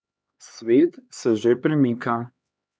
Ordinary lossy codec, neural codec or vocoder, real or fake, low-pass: none; codec, 16 kHz, 2 kbps, X-Codec, HuBERT features, trained on LibriSpeech; fake; none